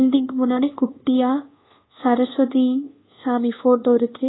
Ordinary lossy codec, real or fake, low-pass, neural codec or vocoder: AAC, 16 kbps; fake; 7.2 kHz; codec, 24 kHz, 1.2 kbps, DualCodec